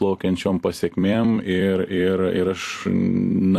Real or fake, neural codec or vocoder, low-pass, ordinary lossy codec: fake; vocoder, 44.1 kHz, 128 mel bands every 256 samples, BigVGAN v2; 14.4 kHz; AAC, 64 kbps